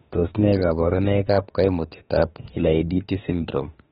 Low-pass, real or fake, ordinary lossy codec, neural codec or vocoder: 19.8 kHz; fake; AAC, 16 kbps; autoencoder, 48 kHz, 32 numbers a frame, DAC-VAE, trained on Japanese speech